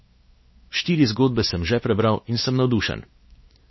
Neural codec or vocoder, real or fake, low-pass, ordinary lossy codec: codec, 16 kHz, 4 kbps, X-Codec, WavLM features, trained on Multilingual LibriSpeech; fake; 7.2 kHz; MP3, 24 kbps